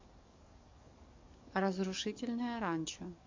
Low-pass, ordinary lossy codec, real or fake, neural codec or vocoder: 7.2 kHz; MP3, 48 kbps; fake; codec, 44.1 kHz, 7.8 kbps, DAC